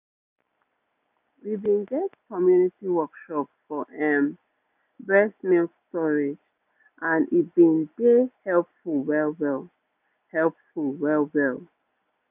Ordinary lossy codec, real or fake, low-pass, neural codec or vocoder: none; real; 3.6 kHz; none